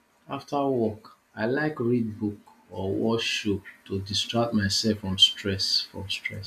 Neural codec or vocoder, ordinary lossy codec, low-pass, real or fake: none; none; 14.4 kHz; real